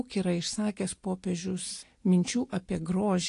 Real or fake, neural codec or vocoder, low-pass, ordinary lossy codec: real; none; 10.8 kHz; AAC, 48 kbps